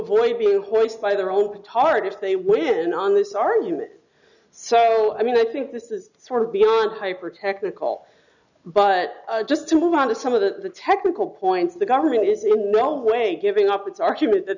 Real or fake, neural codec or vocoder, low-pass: real; none; 7.2 kHz